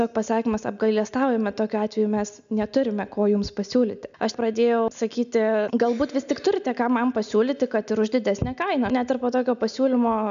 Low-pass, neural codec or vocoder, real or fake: 7.2 kHz; none; real